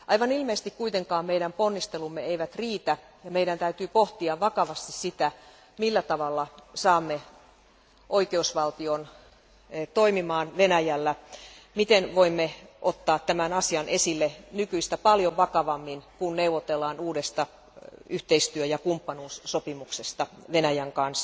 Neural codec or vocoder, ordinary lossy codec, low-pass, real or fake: none; none; none; real